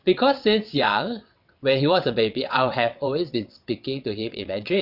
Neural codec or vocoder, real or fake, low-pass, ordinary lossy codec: vocoder, 22.05 kHz, 80 mel bands, WaveNeXt; fake; 5.4 kHz; none